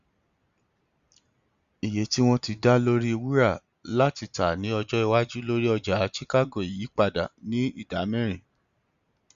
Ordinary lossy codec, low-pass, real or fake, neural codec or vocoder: Opus, 64 kbps; 7.2 kHz; real; none